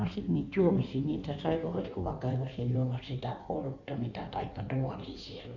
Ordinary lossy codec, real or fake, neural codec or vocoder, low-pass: none; fake; codec, 16 kHz in and 24 kHz out, 1.1 kbps, FireRedTTS-2 codec; 7.2 kHz